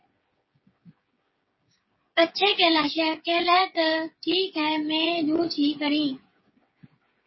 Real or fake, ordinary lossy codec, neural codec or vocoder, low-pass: fake; MP3, 24 kbps; codec, 16 kHz, 8 kbps, FreqCodec, smaller model; 7.2 kHz